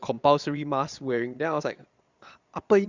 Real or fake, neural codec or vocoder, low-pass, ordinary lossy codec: fake; vocoder, 22.05 kHz, 80 mel bands, Vocos; 7.2 kHz; Opus, 64 kbps